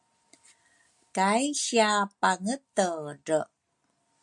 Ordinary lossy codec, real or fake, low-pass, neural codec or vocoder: MP3, 64 kbps; real; 10.8 kHz; none